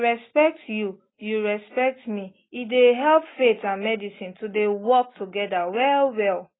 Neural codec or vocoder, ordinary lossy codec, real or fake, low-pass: none; AAC, 16 kbps; real; 7.2 kHz